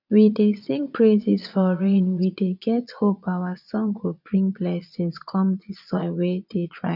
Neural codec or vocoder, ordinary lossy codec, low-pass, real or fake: vocoder, 22.05 kHz, 80 mel bands, Vocos; none; 5.4 kHz; fake